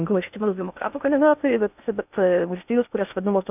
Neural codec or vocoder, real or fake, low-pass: codec, 16 kHz in and 24 kHz out, 0.6 kbps, FocalCodec, streaming, 2048 codes; fake; 3.6 kHz